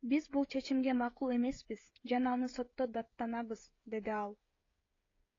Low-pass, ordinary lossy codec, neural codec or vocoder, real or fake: 7.2 kHz; AAC, 32 kbps; codec, 16 kHz, 16 kbps, FreqCodec, smaller model; fake